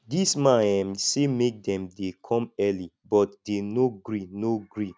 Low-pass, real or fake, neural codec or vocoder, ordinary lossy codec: none; real; none; none